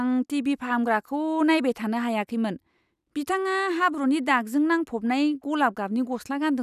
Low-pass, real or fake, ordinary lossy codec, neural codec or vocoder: 14.4 kHz; real; none; none